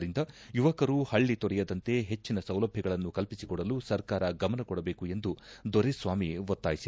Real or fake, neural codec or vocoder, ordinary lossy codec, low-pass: real; none; none; none